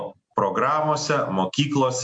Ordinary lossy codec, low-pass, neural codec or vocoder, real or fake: MP3, 48 kbps; 9.9 kHz; none; real